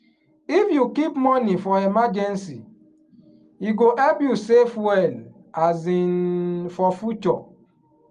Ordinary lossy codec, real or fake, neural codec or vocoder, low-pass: Opus, 32 kbps; real; none; 9.9 kHz